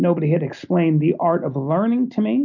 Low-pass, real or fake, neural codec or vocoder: 7.2 kHz; real; none